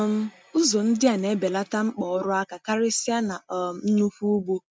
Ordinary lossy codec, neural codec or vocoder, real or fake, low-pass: none; none; real; none